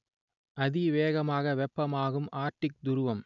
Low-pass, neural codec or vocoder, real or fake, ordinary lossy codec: 7.2 kHz; none; real; none